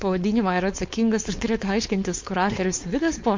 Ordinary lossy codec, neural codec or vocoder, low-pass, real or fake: MP3, 48 kbps; codec, 16 kHz, 4.8 kbps, FACodec; 7.2 kHz; fake